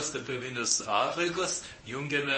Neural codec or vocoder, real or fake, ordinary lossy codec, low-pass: codec, 24 kHz, 0.9 kbps, WavTokenizer, medium speech release version 1; fake; MP3, 32 kbps; 10.8 kHz